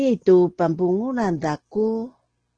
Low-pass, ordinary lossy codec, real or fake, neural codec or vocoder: 9.9 kHz; Opus, 16 kbps; real; none